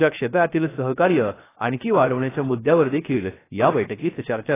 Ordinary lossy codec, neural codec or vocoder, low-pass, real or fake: AAC, 16 kbps; codec, 16 kHz, 0.3 kbps, FocalCodec; 3.6 kHz; fake